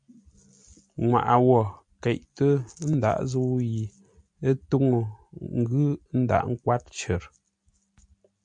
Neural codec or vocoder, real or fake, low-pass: none; real; 9.9 kHz